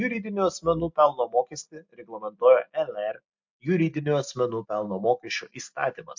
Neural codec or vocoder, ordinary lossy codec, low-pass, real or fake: none; MP3, 48 kbps; 7.2 kHz; real